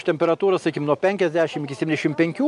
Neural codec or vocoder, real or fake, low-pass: none; real; 10.8 kHz